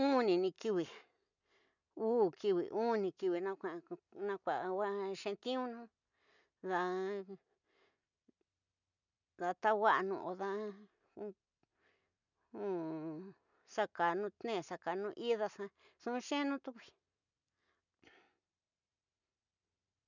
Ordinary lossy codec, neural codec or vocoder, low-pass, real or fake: none; none; 7.2 kHz; real